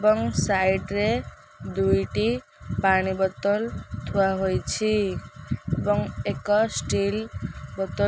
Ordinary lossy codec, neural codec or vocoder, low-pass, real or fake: none; none; none; real